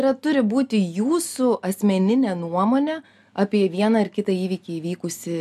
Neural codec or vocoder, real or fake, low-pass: none; real; 14.4 kHz